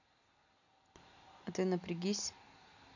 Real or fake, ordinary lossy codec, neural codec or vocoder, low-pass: real; none; none; 7.2 kHz